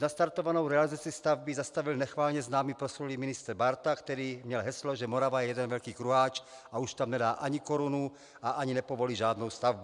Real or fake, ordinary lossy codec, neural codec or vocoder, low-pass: real; MP3, 96 kbps; none; 10.8 kHz